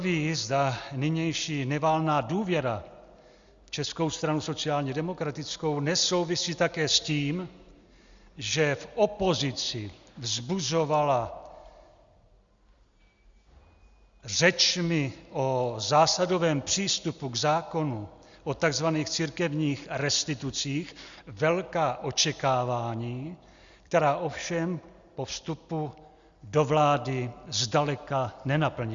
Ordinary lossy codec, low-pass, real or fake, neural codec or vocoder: Opus, 64 kbps; 7.2 kHz; real; none